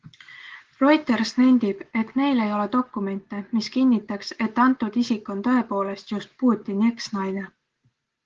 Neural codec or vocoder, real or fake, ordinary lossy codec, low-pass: none; real; Opus, 16 kbps; 7.2 kHz